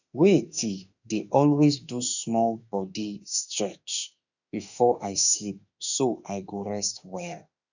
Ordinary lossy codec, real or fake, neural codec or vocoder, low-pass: none; fake; autoencoder, 48 kHz, 32 numbers a frame, DAC-VAE, trained on Japanese speech; 7.2 kHz